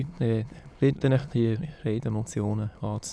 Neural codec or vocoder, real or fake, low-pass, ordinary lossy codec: autoencoder, 22.05 kHz, a latent of 192 numbers a frame, VITS, trained on many speakers; fake; none; none